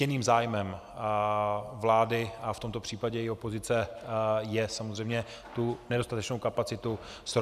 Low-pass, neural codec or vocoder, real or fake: 14.4 kHz; none; real